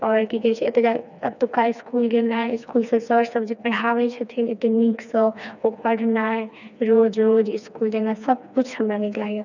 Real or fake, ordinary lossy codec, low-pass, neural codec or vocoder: fake; none; 7.2 kHz; codec, 16 kHz, 2 kbps, FreqCodec, smaller model